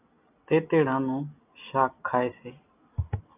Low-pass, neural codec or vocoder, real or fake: 3.6 kHz; none; real